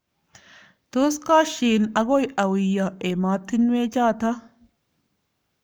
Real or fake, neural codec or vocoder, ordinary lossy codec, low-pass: fake; codec, 44.1 kHz, 7.8 kbps, Pupu-Codec; none; none